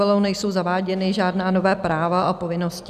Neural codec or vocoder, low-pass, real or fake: none; 14.4 kHz; real